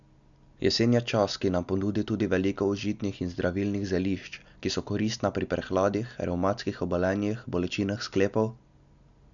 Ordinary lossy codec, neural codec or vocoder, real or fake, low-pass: none; none; real; 7.2 kHz